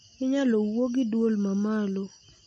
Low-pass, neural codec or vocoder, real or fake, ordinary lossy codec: 9.9 kHz; none; real; MP3, 32 kbps